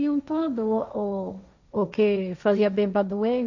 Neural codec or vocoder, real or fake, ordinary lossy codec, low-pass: codec, 16 kHz, 1.1 kbps, Voila-Tokenizer; fake; none; 7.2 kHz